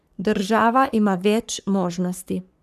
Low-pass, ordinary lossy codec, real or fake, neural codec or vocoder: 14.4 kHz; none; fake; codec, 44.1 kHz, 3.4 kbps, Pupu-Codec